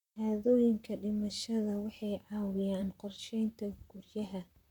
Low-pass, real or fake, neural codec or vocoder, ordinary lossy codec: 19.8 kHz; fake; vocoder, 44.1 kHz, 128 mel bands, Pupu-Vocoder; none